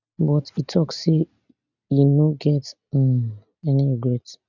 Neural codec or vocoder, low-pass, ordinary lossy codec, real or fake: none; 7.2 kHz; none; real